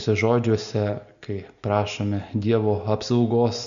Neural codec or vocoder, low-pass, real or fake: none; 7.2 kHz; real